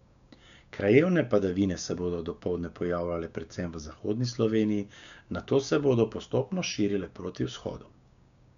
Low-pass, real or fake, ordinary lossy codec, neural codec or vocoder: 7.2 kHz; fake; MP3, 96 kbps; codec, 16 kHz, 6 kbps, DAC